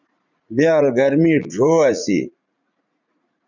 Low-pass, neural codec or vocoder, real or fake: 7.2 kHz; vocoder, 44.1 kHz, 80 mel bands, Vocos; fake